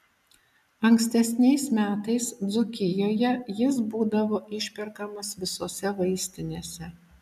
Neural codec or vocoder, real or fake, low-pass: none; real; 14.4 kHz